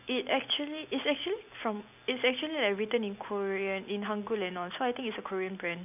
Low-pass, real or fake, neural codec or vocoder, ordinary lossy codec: 3.6 kHz; real; none; none